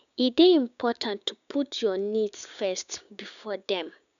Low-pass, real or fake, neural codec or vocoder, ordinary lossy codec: 7.2 kHz; fake; codec, 16 kHz, 6 kbps, DAC; MP3, 96 kbps